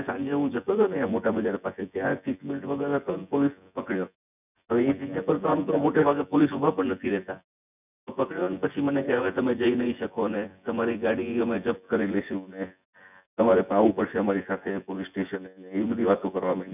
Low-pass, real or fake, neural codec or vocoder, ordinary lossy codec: 3.6 kHz; fake; vocoder, 24 kHz, 100 mel bands, Vocos; none